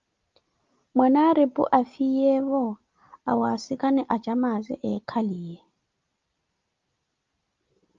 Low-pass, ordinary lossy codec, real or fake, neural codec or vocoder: 7.2 kHz; Opus, 32 kbps; real; none